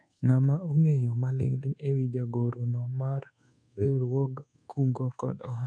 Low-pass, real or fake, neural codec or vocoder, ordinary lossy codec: 9.9 kHz; fake; codec, 24 kHz, 1.2 kbps, DualCodec; none